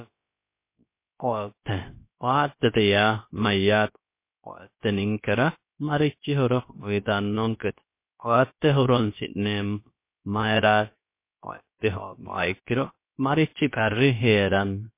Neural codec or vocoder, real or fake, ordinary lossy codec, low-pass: codec, 16 kHz, about 1 kbps, DyCAST, with the encoder's durations; fake; MP3, 24 kbps; 3.6 kHz